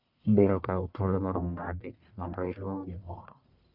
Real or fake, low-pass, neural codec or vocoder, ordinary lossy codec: fake; 5.4 kHz; codec, 44.1 kHz, 1.7 kbps, Pupu-Codec; none